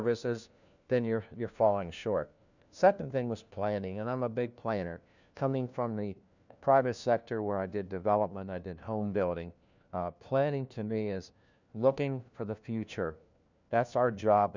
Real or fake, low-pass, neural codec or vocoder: fake; 7.2 kHz; codec, 16 kHz, 1 kbps, FunCodec, trained on LibriTTS, 50 frames a second